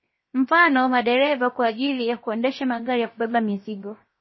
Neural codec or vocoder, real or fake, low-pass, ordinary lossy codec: codec, 16 kHz, 0.7 kbps, FocalCodec; fake; 7.2 kHz; MP3, 24 kbps